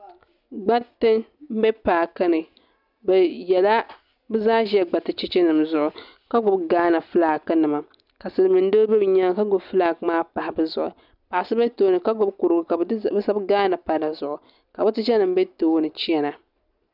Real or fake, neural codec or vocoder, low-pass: real; none; 5.4 kHz